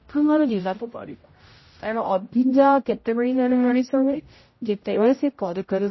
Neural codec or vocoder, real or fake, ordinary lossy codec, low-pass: codec, 16 kHz, 0.5 kbps, X-Codec, HuBERT features, trained on general audio; fake; MP3, 24 kbps; 7.2 kHz